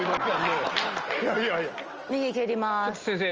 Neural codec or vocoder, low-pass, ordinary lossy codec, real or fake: none; 7.2 kHz; Opus, 24 kbps; real